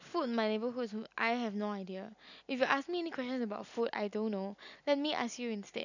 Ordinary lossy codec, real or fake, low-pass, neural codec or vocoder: none; real; 7.2 kHz; none